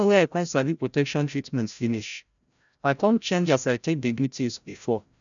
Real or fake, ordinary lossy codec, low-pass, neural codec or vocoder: fake; none; 7.2 kHz; codec, 16 kHz, 0.5 kbps, FreqCodec, larger model